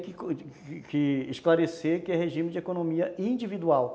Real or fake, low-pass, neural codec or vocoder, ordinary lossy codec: real; none; none; none